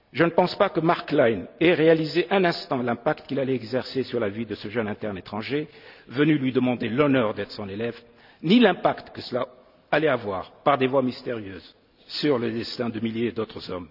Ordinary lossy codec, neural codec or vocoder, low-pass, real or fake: none; none; 5.4 kHz; real